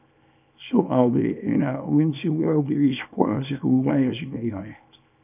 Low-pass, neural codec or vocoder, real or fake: 3.6 kHz; codec, 24 kHz, 0.9 kbps, WavTokenizer, small release; fake